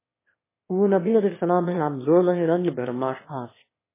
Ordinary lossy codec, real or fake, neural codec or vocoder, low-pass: MP3, 16 kbps; fake; autoencoder, 22.05 kHz, a latent of 192 numbers a frame, VITS, trained on one speaker; 3.6 kHz